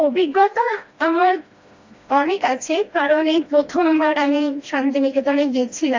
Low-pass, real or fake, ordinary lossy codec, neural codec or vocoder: 7.2 kHz; fake; AAC, 48 kbps; codec, 16 kHz, 1 kbps, FreqCodec, smaller model